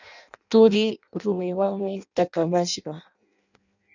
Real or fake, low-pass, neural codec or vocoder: fake; 7.2 kHz; codec, 16 kHz in and 24 kHz out, 0.6 kbps, FireRedTTS-2 codec